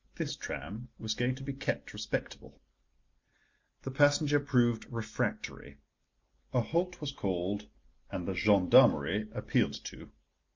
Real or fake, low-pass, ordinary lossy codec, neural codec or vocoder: real; 7.2 kHz; MP3, 48 kbps; none